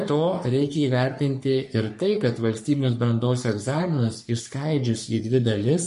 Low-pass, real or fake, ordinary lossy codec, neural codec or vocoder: 14.4 kHz; fake; MP3, 48 kbps; codec, 44.1 kHz, 3.4 kbps, Pupu-Codec